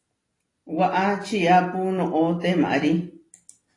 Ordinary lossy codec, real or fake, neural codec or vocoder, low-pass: AAC, 32 kbps; real; none; 10.8 kHz